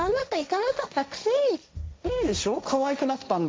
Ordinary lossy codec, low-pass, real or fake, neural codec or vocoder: none; none; fake; codec, 16 kHz, 1.1 kbps, Voila-Tokenizer